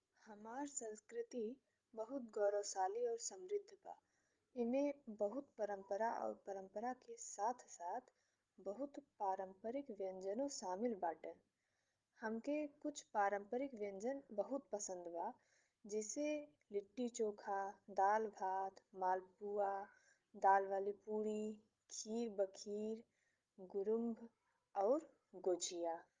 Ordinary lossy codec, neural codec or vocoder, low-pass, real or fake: Opus, 32 kbps; codec, 16 kHz, 16 kbps, FreqCodec, larger model; 7.2 kHz; fake